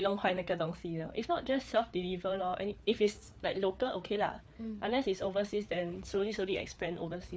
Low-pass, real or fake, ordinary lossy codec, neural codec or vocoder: none; fake; none; codec, 16 kHz, 4 kbps, FreqCodec, larger model